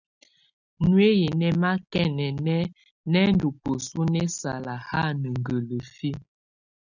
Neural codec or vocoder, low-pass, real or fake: none; 7.2 kHz; real